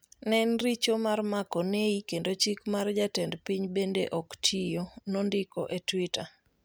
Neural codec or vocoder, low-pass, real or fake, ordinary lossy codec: none; none; real; none